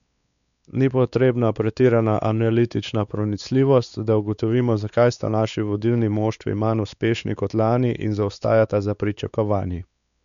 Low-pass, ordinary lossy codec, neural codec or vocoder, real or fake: 7.2 kHz; none; codec, 16 kHz, 4 kbps, X-Codec, WavLM features, trained on Multilingual LibriSpeech; fake